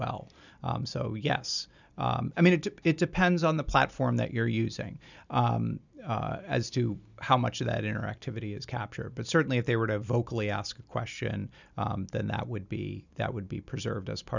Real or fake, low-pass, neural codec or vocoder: real; 7.2 kHz; none